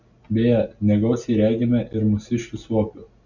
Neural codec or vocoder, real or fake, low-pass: none; real; 7.2 kHz